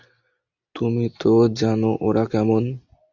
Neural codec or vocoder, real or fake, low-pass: none; real; 7.2 kHz